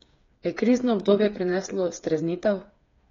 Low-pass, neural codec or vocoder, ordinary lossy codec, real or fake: 7.2 kHz; codec, 16 kHz, 8 kbps, FreqCodec, smaller model; AAC, 32 kbps; fake